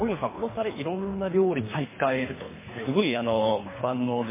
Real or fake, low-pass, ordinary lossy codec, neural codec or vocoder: fake; 3.6 kHz; MP3, 16 kbps; codec, 24 kHz, 3 kbps, HILCodec